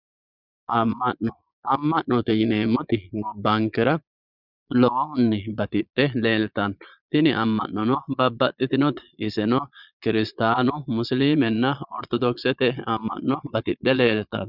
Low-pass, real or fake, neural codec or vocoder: 5.4 kHz; fake; vocoder, 22.05 kHz, 80 mel bands, Vocos